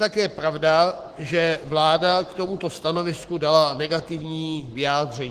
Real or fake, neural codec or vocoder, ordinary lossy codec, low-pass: fake; codec, 44.1 kHz, 7.8 kbps, Pupu-Codec; Opus, 16 kbps; 14.4 kHz